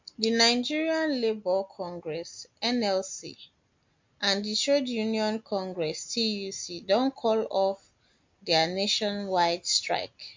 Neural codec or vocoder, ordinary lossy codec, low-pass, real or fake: none; MP3, 48 kbps; 7.2 kHz; real